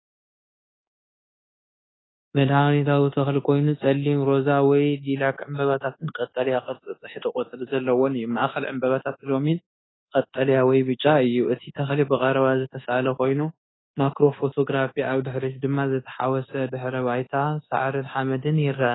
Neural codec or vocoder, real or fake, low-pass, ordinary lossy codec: codec, 24 kHz, 1.2 kbps, DualCodec; fake; 7.2 kHz; AAC, 16 kbps